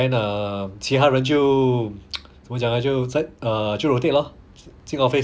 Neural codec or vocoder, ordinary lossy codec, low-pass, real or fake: none; none; none; real